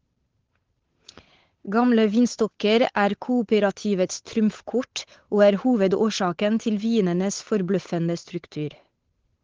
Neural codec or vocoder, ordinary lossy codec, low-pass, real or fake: codec, 16 kHz, 8 kbps, FunCodec, trained on Chinese and English, 25 frames a second; Opus, 16 kbps; 7.2 kHz; fake